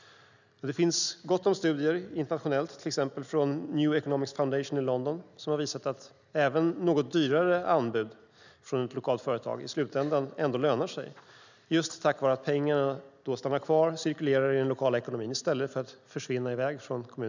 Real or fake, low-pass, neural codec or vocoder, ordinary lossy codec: real; 7.2 kHz; none; none